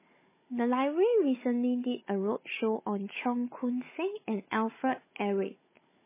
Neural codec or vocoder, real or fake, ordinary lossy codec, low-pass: codec, 16 kHz, 16 kbps, FunCodec, trained on Chinese and English, 50 frames a second; fake; MP3, 16 kbps; 3.6 kHz